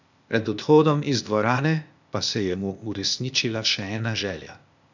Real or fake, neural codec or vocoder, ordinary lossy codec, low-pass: fake; codec, 16 kHz, 0.8 kbps, ZipCodec; none; 7.2 kHz